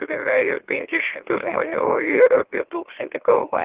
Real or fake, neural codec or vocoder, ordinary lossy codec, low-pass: fake; autoencoder, 44.1 kHz, a latent of 192 numbers a frame, MeloTTS; Opus, 16 kbps; 3.6 kHz